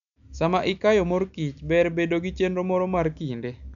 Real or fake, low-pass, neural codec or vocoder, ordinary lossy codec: real; 7.2 kHz; none; none